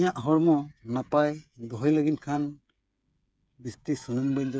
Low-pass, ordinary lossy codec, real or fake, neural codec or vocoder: none; none; fake; codec, 16 kHz, 8 kbps, FreqCodec, smaller model